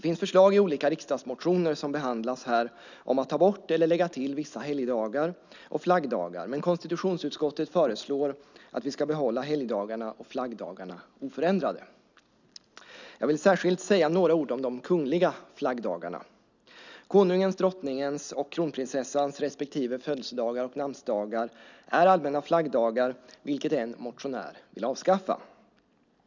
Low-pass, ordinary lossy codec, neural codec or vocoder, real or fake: 7.2 kHz; none; none; real